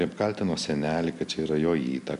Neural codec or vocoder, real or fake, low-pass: none; real; 10.8 kHz